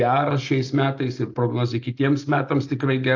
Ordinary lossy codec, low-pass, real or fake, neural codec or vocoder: AAC, 48 kbps; 7.2 kHz; real; none